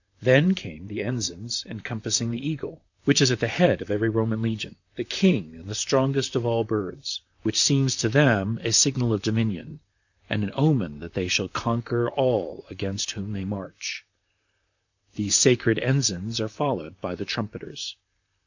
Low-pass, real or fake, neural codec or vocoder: 7.2 kHz; fake; vocoder, 44.1 kHz, 128 mel bands, Pupu-Vocoder